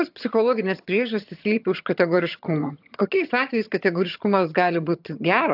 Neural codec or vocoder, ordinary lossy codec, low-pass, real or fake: vocoder, 22.05 kHz, 80 mel bands, HiFi-GAN; AAC, 48 kbps; 5.4 kHz; fake